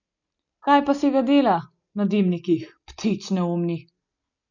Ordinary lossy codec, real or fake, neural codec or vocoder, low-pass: none; real; none; 7.2 kHz